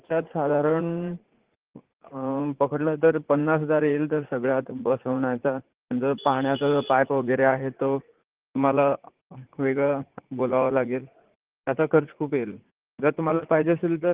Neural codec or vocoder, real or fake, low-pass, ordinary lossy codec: vocoder, 44.1 kHz, 80 mel bands, Vocos; fake; 3.6 kHz; Opus, 16 kbps